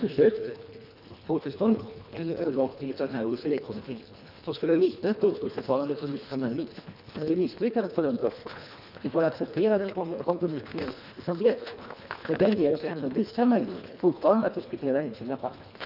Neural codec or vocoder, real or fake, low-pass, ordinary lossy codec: codec, 24 kHz, 1.5 kbps, HILCodec; fake; 5.4 kHz; none